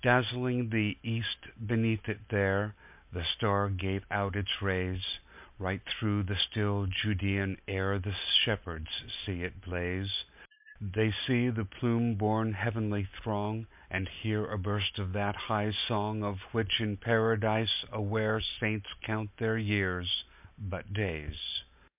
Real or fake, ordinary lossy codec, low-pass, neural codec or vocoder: real; MP3, 32 kbps; 3.6 kHz; none